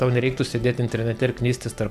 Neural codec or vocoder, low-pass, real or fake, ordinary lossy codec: none; 14.4 kHz; real; MP3, 96 kbps